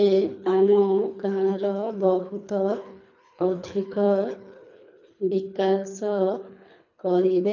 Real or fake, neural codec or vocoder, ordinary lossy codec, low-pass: fake; codec, 24 kHz, 3 kbps, HILCodec; none; 7.2 kHz